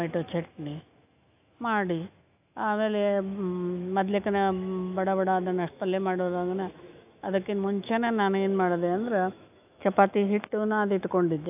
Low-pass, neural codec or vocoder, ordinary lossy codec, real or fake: 3.6 kHz; autoencoder, 48 kHz, 128 numbers a frame, DAC-VAE, trained on Japanese speech; AAC, 32 kbps; fake